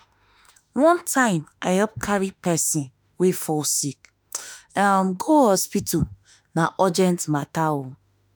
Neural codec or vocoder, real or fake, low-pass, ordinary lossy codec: autoencoder, 48 kHz, 32 numbers a frame, DAC-VAE, trained on Japanese speech; fake; none; none